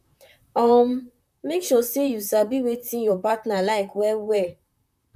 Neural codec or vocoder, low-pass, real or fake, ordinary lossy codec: vocoder, 44.1 kHz, 128 mel bands, Pupu-Vocoder; 14.4 kHz; fake; none